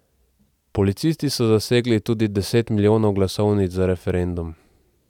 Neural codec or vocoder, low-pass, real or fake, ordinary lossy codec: none; 19.8 kHz; real; none